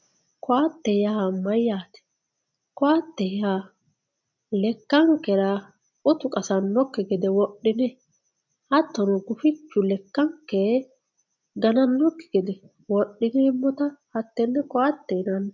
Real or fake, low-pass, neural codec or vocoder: real; 7.2 kHz; none